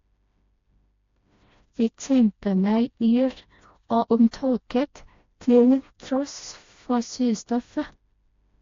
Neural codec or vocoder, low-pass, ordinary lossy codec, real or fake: codec, 16 kHz, 1 kbps, FreqCodec, smaller model; 7.2 kHz; AAC, 48 kbps; fake